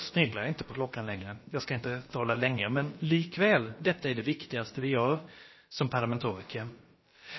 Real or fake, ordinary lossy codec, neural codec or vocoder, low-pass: fake; MP3, 24 kbps; codec, 16 kHz, about 1 kbps, DyCAST, with the encoder's durations; 7.2 kHz